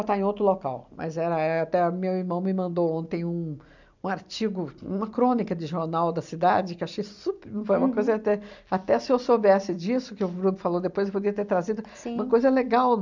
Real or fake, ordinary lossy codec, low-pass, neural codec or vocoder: real; none; 7.2 kHz; none